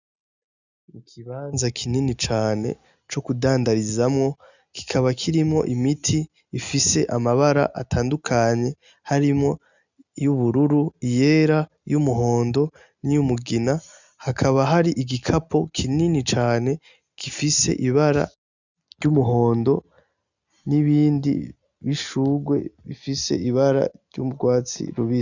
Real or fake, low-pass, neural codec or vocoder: real; 7.2 kHz; none